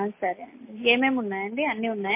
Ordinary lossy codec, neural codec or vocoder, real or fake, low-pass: MP3, 24 kbps; none; real; 3.6 kHz